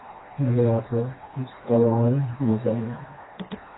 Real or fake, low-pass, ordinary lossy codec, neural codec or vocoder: fake; 7.2 kHz; AAC, 16 kbps; codec, 16 kHz, 2 kbps, FreqCodec, smaller model